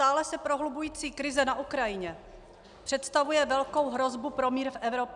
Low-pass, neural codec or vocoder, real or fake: 10.8 kHz; none; real